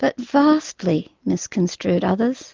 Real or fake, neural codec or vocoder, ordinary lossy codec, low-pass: real; none; Opus, 24 kbps; 7.2 kHz